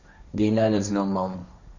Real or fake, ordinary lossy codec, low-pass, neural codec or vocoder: fake; none; 7.2 kHz; codec, 16 kHz, 1.1 kbps, Voila-Tokenizer